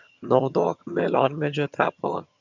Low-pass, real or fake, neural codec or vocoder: 7.2 kHz; fake; vocoder, 22.05 kHz, 80 mel bands, HiFi-GAN